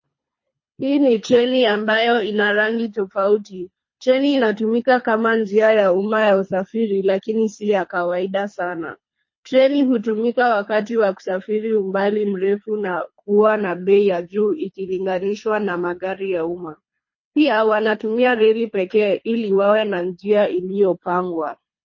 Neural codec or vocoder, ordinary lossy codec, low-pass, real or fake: codec, 24 kHz, 3 kbps, HILCodec; MP3, 32 kbps; 7.2 kHz; fake